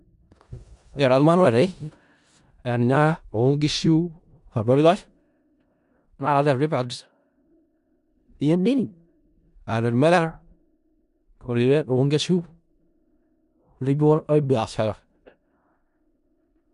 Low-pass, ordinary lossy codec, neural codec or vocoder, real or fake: 10.8 kHz; none; codec, 16 kHz in and 24 kHz out, 0.4 kbps, LongCat-Audio-Codec, four codebook decoder; fake